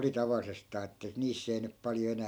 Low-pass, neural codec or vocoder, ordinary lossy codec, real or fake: none; none; none; real